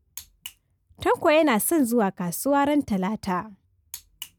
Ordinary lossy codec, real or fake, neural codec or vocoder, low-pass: none; real; none; none